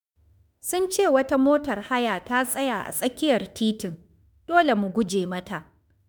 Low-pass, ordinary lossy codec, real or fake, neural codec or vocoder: none; none; fake; autoencoder, 48 kHz, 32 numbers a frame, DAC-VAE, trained on Japanese speech